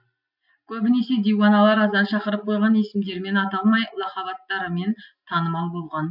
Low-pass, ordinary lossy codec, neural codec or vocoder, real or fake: 5.4 kHz; none; none; real